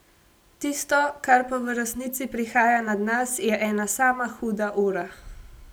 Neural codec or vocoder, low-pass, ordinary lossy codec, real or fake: none; none; none; real